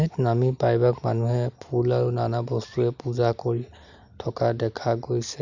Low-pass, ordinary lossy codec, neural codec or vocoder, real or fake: 7.2 kHz; none; none; real